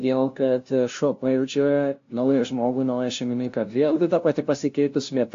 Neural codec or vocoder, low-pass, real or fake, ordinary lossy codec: codec, 16 kHz, 0.5 kbps, FunCodec, trained on LibriTTS, 25 frames a second; 7.2 kHz; fake; AAC, 48 kbps